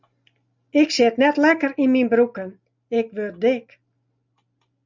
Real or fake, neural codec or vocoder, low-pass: real; none; 7.2 kHz